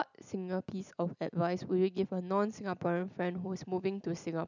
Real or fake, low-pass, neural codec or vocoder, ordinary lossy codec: real; 7.2 kHz; none; none